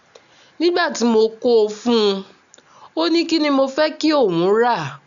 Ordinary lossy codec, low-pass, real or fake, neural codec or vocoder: none; 7.2 kHz; real; none